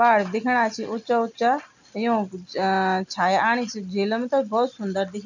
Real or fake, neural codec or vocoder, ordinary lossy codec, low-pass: real; none; none; 7.2 kHz